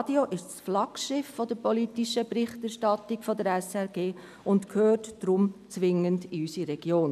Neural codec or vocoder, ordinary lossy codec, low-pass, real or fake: none; none; 14.4 kHz; real